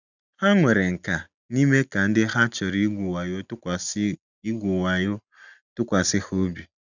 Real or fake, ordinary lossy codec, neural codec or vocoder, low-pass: real; none; none; 7.2 kHz